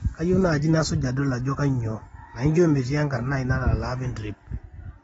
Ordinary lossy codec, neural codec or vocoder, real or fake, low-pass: AAC, 24 kbps; none; real; 19.8 kHz